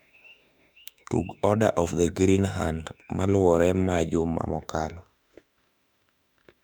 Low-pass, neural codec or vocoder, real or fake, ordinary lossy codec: 19.8 kHz; autoencoder, 48 kHz, 32 numbers a frame, DAC-VAE, trained on Japanese speech; fake; none